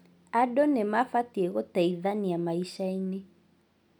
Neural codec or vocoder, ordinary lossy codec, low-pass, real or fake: none; none; 19.8 kHz; real